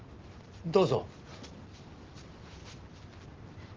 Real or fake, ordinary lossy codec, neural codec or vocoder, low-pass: real; Opus, 16 kbps; none; 7.2 kHz